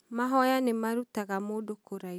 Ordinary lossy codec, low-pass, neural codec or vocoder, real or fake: none; none; none; real